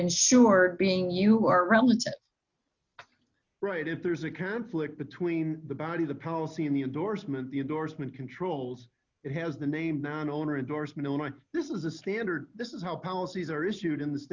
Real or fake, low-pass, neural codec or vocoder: real; 7.2 kHz; none